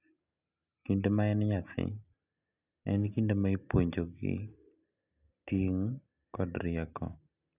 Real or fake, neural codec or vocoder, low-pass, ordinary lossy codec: real; none; 3.6 kHz; none